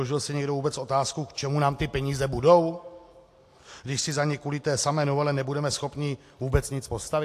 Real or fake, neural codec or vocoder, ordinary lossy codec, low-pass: real; none; AAC, 64 kbps; 14.4 kHz